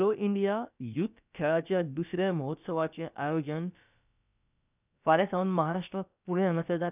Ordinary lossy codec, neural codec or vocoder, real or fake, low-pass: none; codec, 16 kHz, about 1 kbps, DyCAST, with the encoder's durations; fake; 3.6 kHz